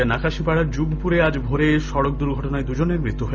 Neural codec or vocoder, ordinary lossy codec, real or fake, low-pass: none; none; real; none